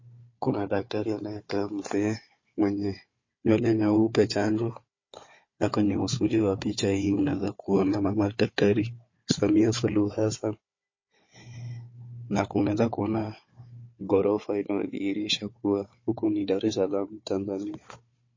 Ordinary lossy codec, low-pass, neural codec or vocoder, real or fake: MP3, 32 kbps; 7.2 kHz; codec, 16 kHz, 4 kbps, FunCodec, trained on Chinese and English, 50 frames a second; fake